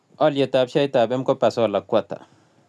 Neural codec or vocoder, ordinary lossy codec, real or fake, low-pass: none; none; real; none